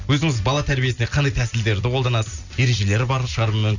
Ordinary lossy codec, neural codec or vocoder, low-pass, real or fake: none; none; 7.2 kHz; real